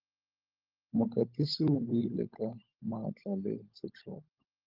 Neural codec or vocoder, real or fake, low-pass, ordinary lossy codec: codec, 16 kHz, 16 kbps, FunCodec, trained on LibriTTS, 50 frames a second; fake; 5.4 kHz; Opus, 32 kbps